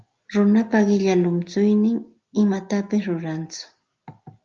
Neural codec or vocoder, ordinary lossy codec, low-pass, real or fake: none; Opus, 32 kbps; 7.2 kHz; real